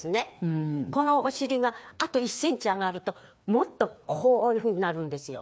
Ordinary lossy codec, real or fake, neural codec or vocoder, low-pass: none; fake; codec, 16 kHz, 2 kbps, FreqCodec, larger model; none